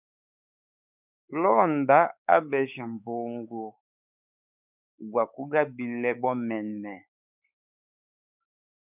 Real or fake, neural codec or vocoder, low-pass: fake; codec, 16 kHz, 4 kbps, X-Codec, WavLM features, trained on Multilingual LibriSpeech; 3.6 kHz